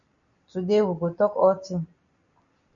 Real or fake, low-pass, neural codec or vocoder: real; 7.2 kHz; none